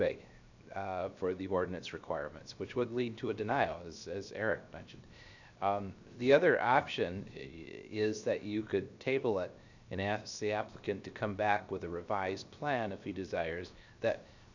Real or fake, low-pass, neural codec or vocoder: fake; 7.2 kHz; codec, 16 kHz, 0.7 kbps, FocalCodec